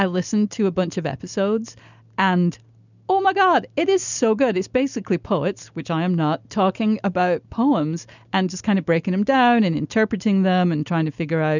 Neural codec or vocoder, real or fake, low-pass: none; real; 7.2 kHz